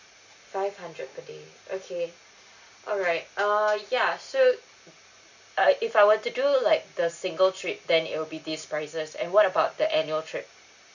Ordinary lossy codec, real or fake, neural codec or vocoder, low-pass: none; real; none; 7.2 kHz